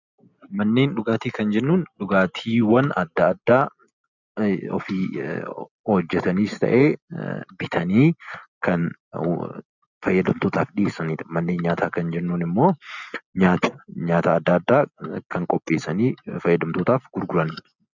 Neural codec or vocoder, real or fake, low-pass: none; real; 7.2 kHz